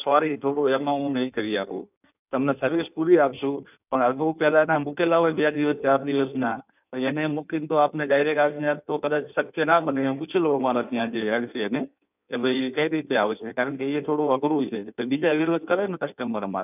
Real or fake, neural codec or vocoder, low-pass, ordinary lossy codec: fake; codec, 16 kHz in and 24 kHz out, 1.1 kbps, FireRedTTS-2 codec; 3.6 kHz; none